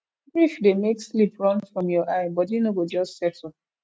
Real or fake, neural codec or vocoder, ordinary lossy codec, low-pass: real; none; none; none